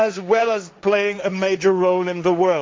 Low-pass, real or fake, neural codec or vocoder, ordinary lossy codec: none; fake; codec, 16 kHz, 1.1 kbps, Voila-Tokenizer; none